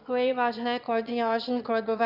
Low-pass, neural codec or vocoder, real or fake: 5.4 kHz; autoencoder, 22.05 kHz, a latent of 192 numbers a frame, VITS, trained on one speaker; fake